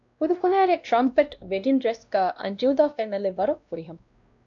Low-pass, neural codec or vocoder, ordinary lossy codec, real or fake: 7.2 kHz; codec, 16 kHz, 1 kbps, X-Codec, WavLM features, trained on Multilingual LibriSpeech; AAC, 64 kbps; fake